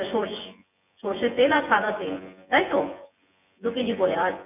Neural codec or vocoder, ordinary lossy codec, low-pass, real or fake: vocoder, 24 kHz, 100 mel bands, Vocos; none; 3.6 kHz; fake